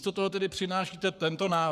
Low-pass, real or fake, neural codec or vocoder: 14.4 kHz; fake; codec, 44.1 kHz, 7.8 kbps, Pupu-Codec